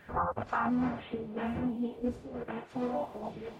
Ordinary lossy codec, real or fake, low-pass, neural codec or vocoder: MP3, 64 kbps; fake; 19.8 kHz; codec, 44.1 kHz, 0.9 kbps, DAC